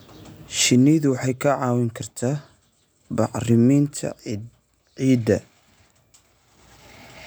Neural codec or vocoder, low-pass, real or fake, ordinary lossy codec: none; none; real; none